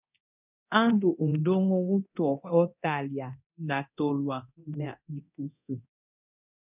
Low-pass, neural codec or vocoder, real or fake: 3.6 kHz; codec, 24 kHz, 0.9 kbps, DualCodec; fake